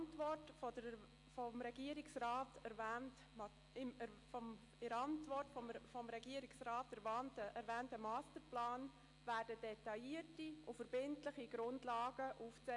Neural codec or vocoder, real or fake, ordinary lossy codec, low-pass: none; real; AAC, 48 kbps; 10.8 kHz